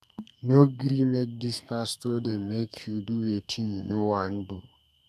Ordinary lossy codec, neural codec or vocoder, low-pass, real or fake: none; codec, 32 kHz, 1.9 kbps, SNAC; 14.4 kHz; fake